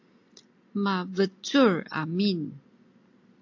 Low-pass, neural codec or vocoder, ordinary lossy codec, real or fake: 7.2 kHz; none; AAC, 48 kbps; real